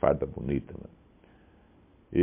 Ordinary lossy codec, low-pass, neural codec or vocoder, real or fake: MP3, 24 kbps; 3.6 kHz; none; real